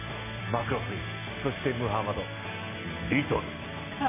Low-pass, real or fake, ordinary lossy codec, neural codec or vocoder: 3.6 kHz; real; MP3, 16 kbps; none